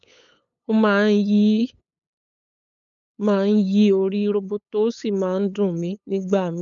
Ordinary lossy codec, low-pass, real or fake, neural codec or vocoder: none; 7.2 kHz; fake; codec, 16 kHz, 8 kbps, FunCodec, trained on LibriTTS, 25 frames a second